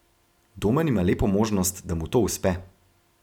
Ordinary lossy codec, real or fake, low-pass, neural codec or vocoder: none; real; 19.8 kHz; none